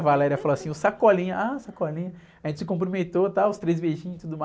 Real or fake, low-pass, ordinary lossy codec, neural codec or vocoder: real; none; none; none